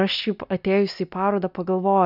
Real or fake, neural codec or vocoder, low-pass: real; none; 5.4 kHz